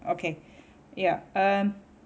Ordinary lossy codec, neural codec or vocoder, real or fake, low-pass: none; none; real; none